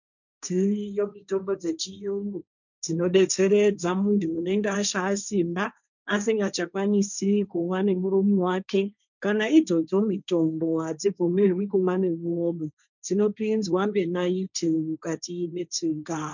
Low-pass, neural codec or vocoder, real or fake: 7.2 kHz; codec, 16 kHz, 1.1 kbps, Voila-Tokenizer; fake